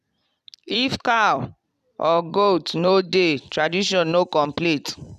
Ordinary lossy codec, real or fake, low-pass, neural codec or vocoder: none; fake; 14.4 kHz; vocoder, 44.1 kHz, 128 mel bands every 256 samples, BigVGAN v2